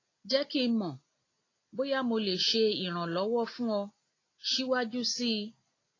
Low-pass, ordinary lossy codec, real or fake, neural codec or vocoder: 7.2 kHz; AAC, 32 kbps; real; none